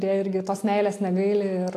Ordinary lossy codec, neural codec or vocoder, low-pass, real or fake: AAC, 64 kbps; none; 14.4 kHz; real